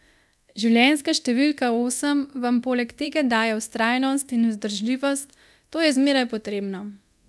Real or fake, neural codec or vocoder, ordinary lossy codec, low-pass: fake; codec, 24 kHz, 0.9 kbps, DualCodec; none; none